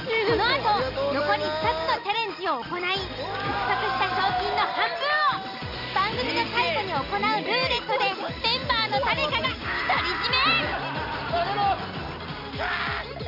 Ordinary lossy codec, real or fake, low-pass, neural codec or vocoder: AAC, 48 kbps; real; 5.4 kHz; none